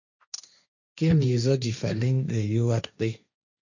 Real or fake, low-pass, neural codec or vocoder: fake; 7.2 kHz; codec, 16 kHz, 1.1 kbps, Voila-Tokenizer